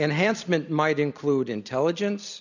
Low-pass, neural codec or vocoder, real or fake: 7.2 kHz; none; real